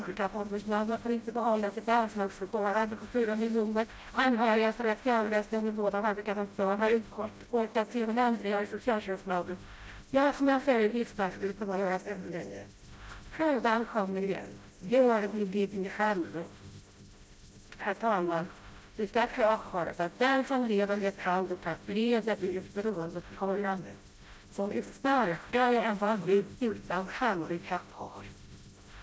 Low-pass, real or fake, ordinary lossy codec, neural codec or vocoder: none; fake; none; codec, 16 kHz, 0.5 kbps, FreqCodec, smaller model